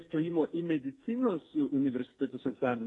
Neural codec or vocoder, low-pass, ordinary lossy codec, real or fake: codec, 44.1 kHz, 2.6 kbps, SNAC; 10.8 kHz; AAC, 32 kbps; fake